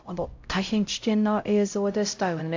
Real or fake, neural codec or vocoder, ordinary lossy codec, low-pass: fake; codec, 16 kHz, 0.5 kbps, X-Codec, HuBERT features, trained on LibriSpeech; AAC, 48 kbps; 7.2 kHz